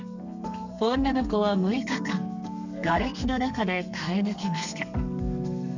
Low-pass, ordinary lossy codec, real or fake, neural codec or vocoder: 7.2 kHz; none; fake; codec, 32 kHz, 1.9 kbps, SNAC